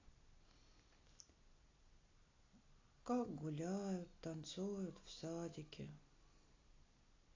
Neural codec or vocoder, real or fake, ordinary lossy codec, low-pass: none; real; AAC, 32 kbps; 7.2 kHz